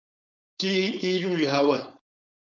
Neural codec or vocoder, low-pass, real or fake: codec, 16 kHz, 4.8 kbps, FACodec; 7.2 kHz; fake